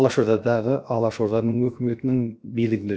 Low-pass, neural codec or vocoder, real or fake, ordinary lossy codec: none; codec, 16 kHz, 0.7 kbps, FocalCodec; fake; none